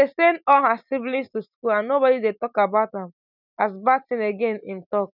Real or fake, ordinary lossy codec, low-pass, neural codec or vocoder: real; none; 5.4 kHz; none